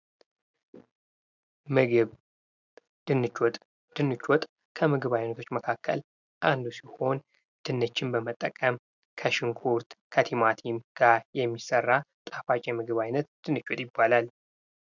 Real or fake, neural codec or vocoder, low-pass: real; none; 7.2 kHz